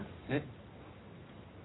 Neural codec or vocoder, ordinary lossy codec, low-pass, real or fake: none; AAC, 16 kbps; 7.2 kHz; real